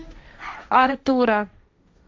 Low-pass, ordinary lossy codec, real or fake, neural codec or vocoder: none; none; fake; codec, 16 kHz, 1.1 kbps, Voila-Tokenizer